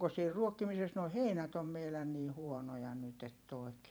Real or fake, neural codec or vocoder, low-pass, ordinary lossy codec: fake; vocoder, 44.1 kHz, 128 mel bands every 512 samples, BigVGAN v2; none; none